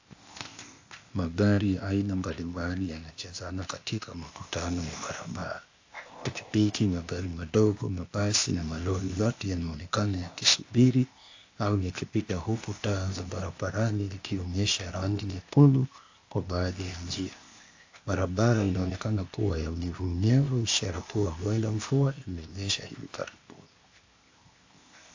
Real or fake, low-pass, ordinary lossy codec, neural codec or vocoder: fake; 7.2 kHz; AAC, 48 kbps; codec, 16 kHz, 0.8 kbps, ZipCodec